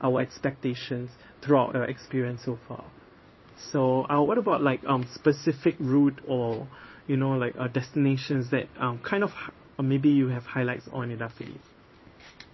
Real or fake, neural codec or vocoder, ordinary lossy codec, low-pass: fake; codec, 16 kHz in and 24 kHz out, 1 kbps, XY-Tokenizer; MP3, 24 kbps; 7.2 kHz